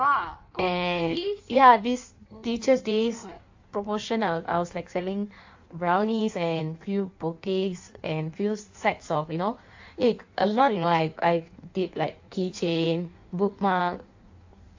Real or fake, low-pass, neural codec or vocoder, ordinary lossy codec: fake; 7.2 kHz; codec, 16 kHz in and 24 kHz out, 1.1 kbps, FireRedTTS-2 codec; AAC, 48 kbps